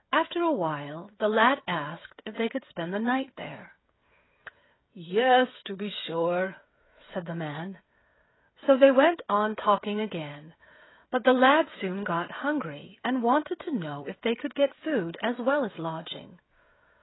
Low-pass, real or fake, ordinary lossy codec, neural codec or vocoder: 7.2 kHz; fake; AAC, 16 kbps; codec, 16 kHz, 16 kbps, FreqCodec, smaller model